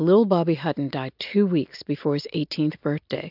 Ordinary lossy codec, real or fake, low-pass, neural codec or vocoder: AAC, 48 kbps; real; 5.4 kHz; none